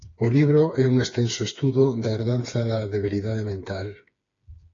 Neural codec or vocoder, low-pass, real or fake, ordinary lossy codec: codec, 16 kHz, 4 kbps, FreqCodec, smaller model; 7.2 kHz; fake; AAC, 32 kbps